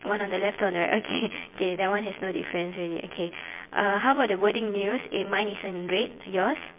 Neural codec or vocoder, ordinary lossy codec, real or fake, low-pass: vocoder, 22.05 kHz, 80 mel bands, Vocos; MP3, 32 kbps; fake; 3.6 kHz